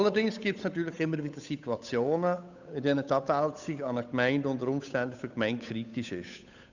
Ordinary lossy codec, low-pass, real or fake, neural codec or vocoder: none; 7.2 kHz; fake; codec, 16 kHz, 8 kbps, FunCodec, trained on Chinese and English, 25 frames a second